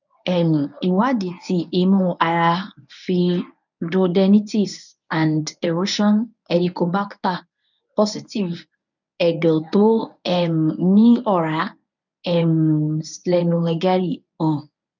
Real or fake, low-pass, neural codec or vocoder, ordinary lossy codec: fake; 7.2 kHz; codec, 24 kHz, 0.9 kbps, WavTokenizer, medium speech release version 1; none